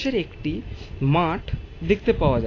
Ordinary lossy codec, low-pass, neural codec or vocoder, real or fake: AAC, 32 kbps; 7.2 kHz; none; real